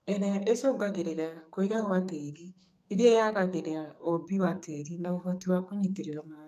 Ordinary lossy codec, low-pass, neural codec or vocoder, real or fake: none; 14.4 kHz; codec, 44.1 kHz, 2.6 kbps, SNAC; fake